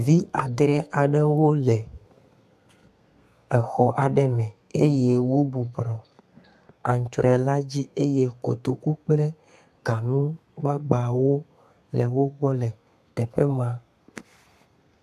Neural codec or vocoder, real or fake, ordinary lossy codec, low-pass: codec, 32 kHz, 1.9 kbps, SNAC; fake; AAC, 96 kbps; 14.4 kHz